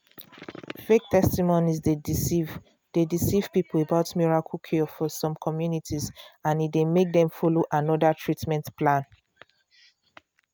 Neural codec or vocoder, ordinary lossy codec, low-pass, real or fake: none; none; none; real